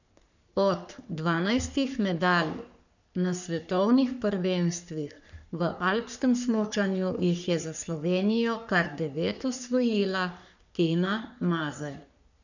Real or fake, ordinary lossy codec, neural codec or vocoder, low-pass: fake; none; codec, 44.1 kHz, 3.4 kbps, Pupu-Codec; 7.2 kHz